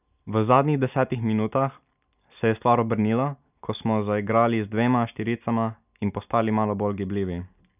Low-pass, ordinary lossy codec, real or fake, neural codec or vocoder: 3.6 kHz; none; real; none